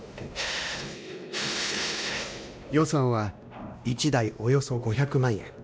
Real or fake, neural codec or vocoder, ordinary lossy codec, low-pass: fake; codec, 16 kHz, 1 kbps, X-Codec, WavLM features, trained on Multilingual LibriSpeech; none; none